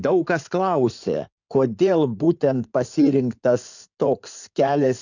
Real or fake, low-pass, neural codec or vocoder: fake; 7.2 kHz; codec, 16 kHz in and 24 kHz out, 2.2 kbps, FireRedTTS-2 codec